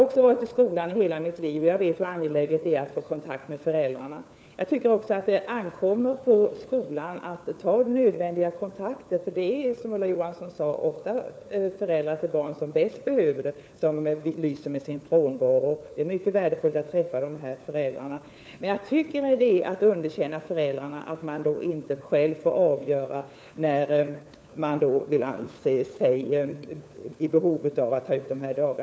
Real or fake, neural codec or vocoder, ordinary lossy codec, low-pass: fake; codec, 16 kHz, 4 kbps, FunCodec, trained on LibriTTS, 50 frames a second; none; none